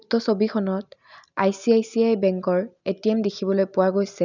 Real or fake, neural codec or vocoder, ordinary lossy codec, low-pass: real; none; none; 7.2 kHz